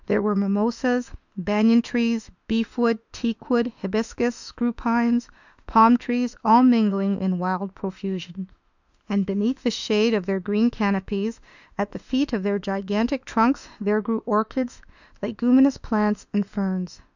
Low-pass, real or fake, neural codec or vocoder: 7.2 kHz; fake; autoencoder, 48 kHz, 32 numbers a frame, DAC-VAE, trained on Japanese speech